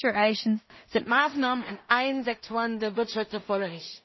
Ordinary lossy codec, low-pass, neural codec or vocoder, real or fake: MP3, 24 kbps; 7.2 kHz; codec, 16 kHz in and 24 kHz out, 0.4 kbps, LongCat-Audio-Codec, two codebook decoder; fake